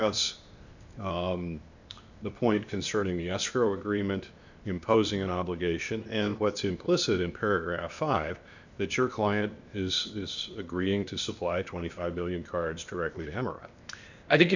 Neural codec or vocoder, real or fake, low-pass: codec, 16 kHz, 0.8 kbps, ZipCodec; fake; 7.2 kHz